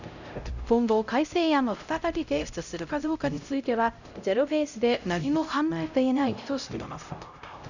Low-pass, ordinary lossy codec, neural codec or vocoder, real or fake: 7.2 kHz; none; codec, 16 kHz, 0.5 kbps, X-Codec, HuBERT features, trained on LibriSpeech; fake